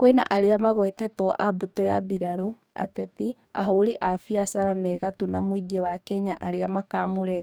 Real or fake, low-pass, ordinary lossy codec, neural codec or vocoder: fake; none; none; codec, 44.1 kHz, 2.6 kbps, DAC